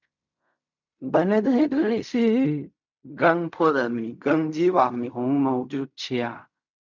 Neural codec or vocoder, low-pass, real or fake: codec, 16 kHz in and 24 kHz out, 0.4 kbps, LongCat-Audio-Codec, fine tuned four codebook decoder; 7.2 kHz; fake